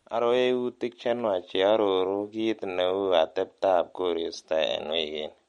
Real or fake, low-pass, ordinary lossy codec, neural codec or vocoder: real; 19.8 kHz; MP3, 48 kbps; none